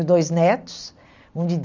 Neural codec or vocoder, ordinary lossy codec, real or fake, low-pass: none; none; real; 7.2 kHz